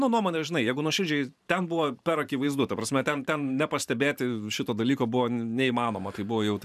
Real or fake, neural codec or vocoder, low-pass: fake; vocoder, 44.1 kHz, 128 mel bands every 512 samples, BigVGAN v2; 14.4 kHz